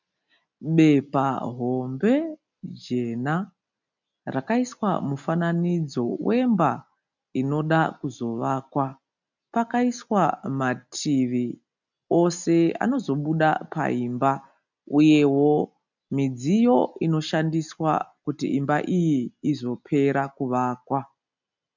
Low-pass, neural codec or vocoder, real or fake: 7.2 kHz; none; real